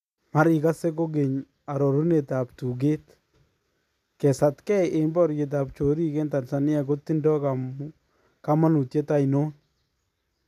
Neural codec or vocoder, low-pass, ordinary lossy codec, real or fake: none; 14.4 kHz; none; real